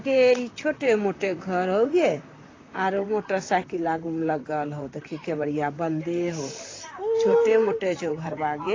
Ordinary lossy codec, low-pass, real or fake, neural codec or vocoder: AAC, 32 kbps; 7.2 kHz; fake; vocoder, 44.1 kHz, 128 mel bands, Pupu-Vocoder